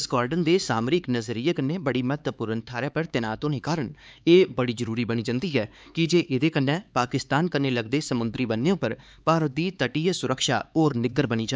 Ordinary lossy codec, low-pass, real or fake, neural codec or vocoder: none; none; fake; codec, 16 kHz, 4 kbps, X-Codec, HuBERT features, trained on LibriSpeech